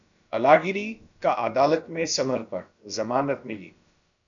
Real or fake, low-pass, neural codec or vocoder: fake; 7.2 kHz; codec, 16 kHz, about 1 kbps, DyCAST, with the encoder's durations